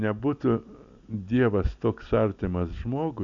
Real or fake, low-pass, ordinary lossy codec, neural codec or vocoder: real; 7.2 kHz; AAC, 64 kbps; none